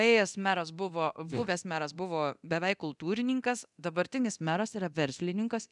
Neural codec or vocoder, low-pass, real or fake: codec, 24 kHz, 0.9 kbps, DualCodec; 10.8 kHz; fake